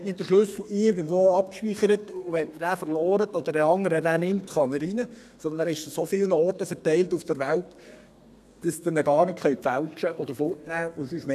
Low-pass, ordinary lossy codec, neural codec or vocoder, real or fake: 14.4 kHz; none; codec, 32 kHz, 1.9 kbps, SNAC; fake